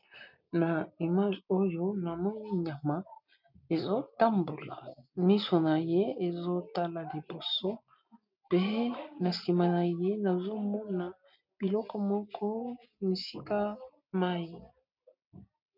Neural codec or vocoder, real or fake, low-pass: none; real; 5.4 kHz